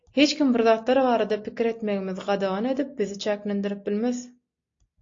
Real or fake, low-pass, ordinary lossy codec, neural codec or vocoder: real; 7.2 kHz; AAC, 48 kbps; none